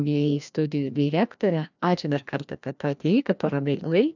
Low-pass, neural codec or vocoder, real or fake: 7.2 kHz; codec, 16 kHz, 1 kbps, FreqCodec, larger model; fake